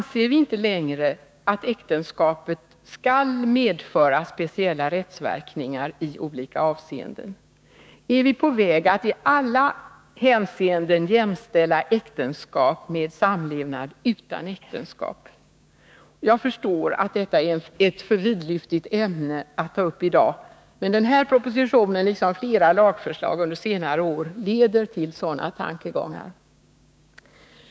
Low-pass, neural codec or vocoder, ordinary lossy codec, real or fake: none; codec, 16 kHz, 6 kbps, DAC; none; fake